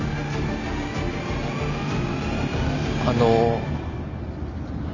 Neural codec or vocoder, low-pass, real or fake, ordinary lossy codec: none; 7.2 kHz; real; none